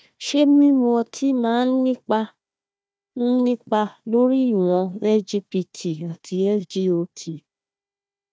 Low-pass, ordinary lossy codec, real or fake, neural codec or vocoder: none; none; fake; codec, 16 kHz, 1 kbps, FunCodec, trained on Chinese and English, 50 frames a second